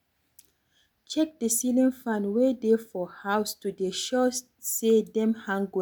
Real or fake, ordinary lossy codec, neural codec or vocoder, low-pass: real; none; none; none